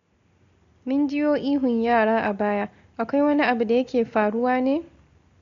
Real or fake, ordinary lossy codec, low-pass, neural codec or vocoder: real; AAC, 48 kbps; 7.2 kHz; none